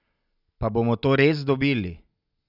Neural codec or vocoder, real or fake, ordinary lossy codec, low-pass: none; real; none; 5.4 kHz